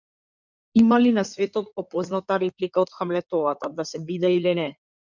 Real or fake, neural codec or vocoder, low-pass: fake; codec, 16 kHz in and 24 kHz out, 2.2 kbps, FireRedTTS-2 codec; 7.2 kHz